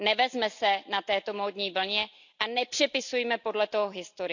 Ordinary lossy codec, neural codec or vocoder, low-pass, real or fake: none; none; 7.2 kHz; real